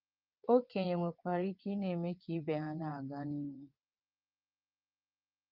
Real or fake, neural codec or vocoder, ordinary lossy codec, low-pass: fake; vocoder, 22.05 kHz, 80 mel bands, WaveNeXt; Opus, 24 kbps; 5.4 kHz